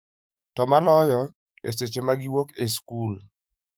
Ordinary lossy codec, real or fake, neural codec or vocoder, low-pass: none; fake; codec, 44.1 kHz, 7.8 kbps, DAC; none